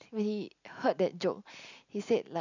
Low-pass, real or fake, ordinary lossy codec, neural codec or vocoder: 7.2 kHz; real; none; none